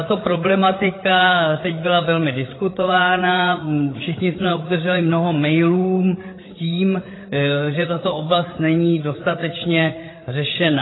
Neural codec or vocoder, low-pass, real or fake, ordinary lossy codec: codec, 16 kHz, 8 kbps, FreqCodec, larger model; 7.2 kHz; fake; AAC, 16 kbps